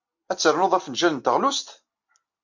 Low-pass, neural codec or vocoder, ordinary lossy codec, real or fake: 7.2 kHz; none; MP3, 48 kbps; real